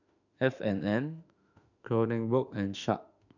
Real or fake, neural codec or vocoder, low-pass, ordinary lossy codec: fake; autoencoder, 48 kHz, 32 numbers a frame, DAC-VAE, trained on Japanese speech; 7.2 kHz; none